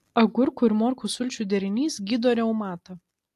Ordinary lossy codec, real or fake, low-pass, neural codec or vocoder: AAC, 64 kbps; real; 14.4 kHz; none